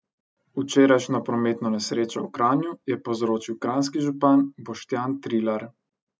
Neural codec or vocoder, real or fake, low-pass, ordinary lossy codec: none; real; none; none